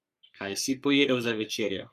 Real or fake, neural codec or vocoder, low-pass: fake; codec, 44.1 kHz, 3.4 kbps, Pupu-Codec; 14.4 kHz